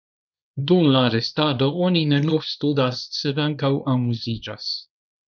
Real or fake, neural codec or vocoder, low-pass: fake; codec, 24 kHz, 0.9 kbps, WavTokenizer, small release; 7.2 kHz